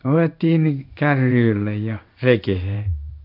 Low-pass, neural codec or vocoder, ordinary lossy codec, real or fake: 5.4 kHz; codec, 16 kHz in and 24 kHz out, 1 kbps, XY-Tokenizer; none; fake